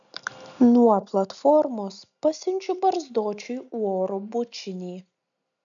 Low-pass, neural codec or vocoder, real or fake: 7.2 kHz; none; real